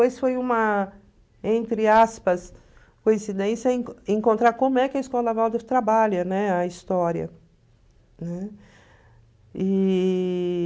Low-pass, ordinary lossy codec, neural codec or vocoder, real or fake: none; none; none; real